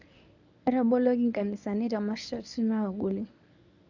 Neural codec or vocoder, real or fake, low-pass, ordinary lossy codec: codec, 24 kHz, 0.9 kbps, WavTokenizer, medium speech release version 1; fake; 7.2 kHz; none